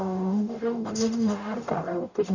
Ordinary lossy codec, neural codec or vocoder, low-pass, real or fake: none; codec, 44.1 kHz, 0.9 kbps, DAC; 7.2 kHz; fake